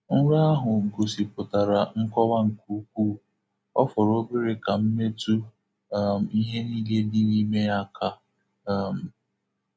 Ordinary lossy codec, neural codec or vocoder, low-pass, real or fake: none; none; none; real